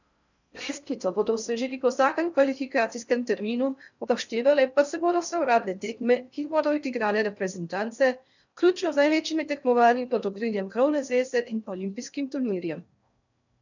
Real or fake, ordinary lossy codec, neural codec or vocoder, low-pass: fake; none; codec, 16 kHz in and 24 kHz out, 0.6 kbps, FocalCodec, streaming, 4096 codes; 7.2 kHz